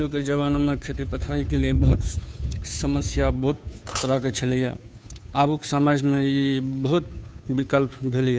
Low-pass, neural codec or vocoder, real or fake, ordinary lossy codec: none; codec, 16 kHz, 2 kbps, FunCodec, trained on Chinese and English, 25 frames a second; fake; none